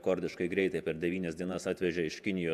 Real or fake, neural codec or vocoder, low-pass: fake; vocoder, 48 kHz, 128 mel bands, Vocos; 14.4 kHz